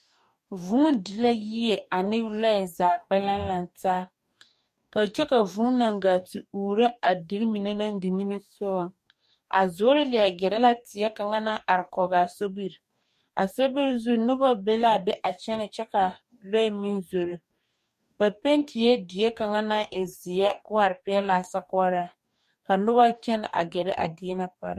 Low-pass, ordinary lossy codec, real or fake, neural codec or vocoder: 14.4 kHz; MP3, 64 kbps; fake; codec, 44.1 kHz, 2.6 kbps, DAC